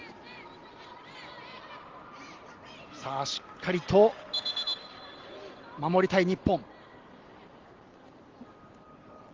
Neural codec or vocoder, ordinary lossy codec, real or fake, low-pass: none; Opus, 16 kbps; real; 7.2 kHz